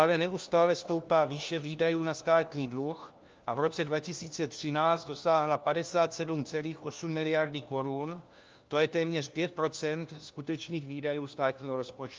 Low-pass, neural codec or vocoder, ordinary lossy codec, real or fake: 7.2 kHz; codec, 16 kHz, 1 kbps, FunCodec, trained on LibriTTS, 50 frames a second; Opus, 24 kbps; fake